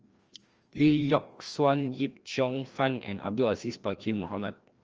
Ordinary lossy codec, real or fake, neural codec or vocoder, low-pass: Opus, 24 kbps; fake; codec, 16 kHz, 1 kbps, FreqCodec, larger model; 7.2 kHz